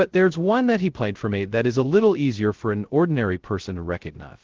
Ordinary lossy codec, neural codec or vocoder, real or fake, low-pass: Opus, 16 kbps; codec, 16 kHz, 0.2 kbps, FocalCodec; fake; 7.2 kHz